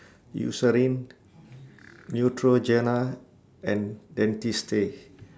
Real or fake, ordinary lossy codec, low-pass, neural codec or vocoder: real; none; none; none